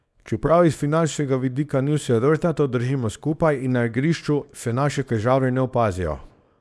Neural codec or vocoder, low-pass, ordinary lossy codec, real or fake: codec, 24 kHz, 0.9 kbps, WavTokenizer, small release; none; none; fake